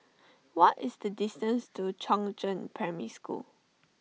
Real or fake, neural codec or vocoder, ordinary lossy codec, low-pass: real; none; none; none